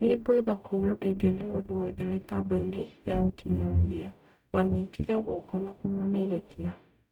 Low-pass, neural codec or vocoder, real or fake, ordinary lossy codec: 19.8 kHz; codec, 44.1 kHz, 0.9 kbps, DAC; fake; none